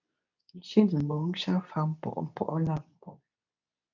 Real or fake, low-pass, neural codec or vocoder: fake; 7.2 kHz; codec, 24 kHz, 0.9 kbps, WavTokenizer, medium speech release version 2